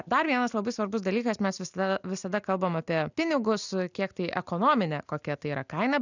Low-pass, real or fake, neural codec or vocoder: 7.2 kHz; real; none